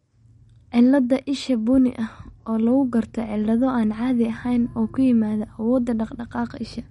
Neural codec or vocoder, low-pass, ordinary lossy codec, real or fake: none; 19.8 kHz; MP3, 48 kbps; real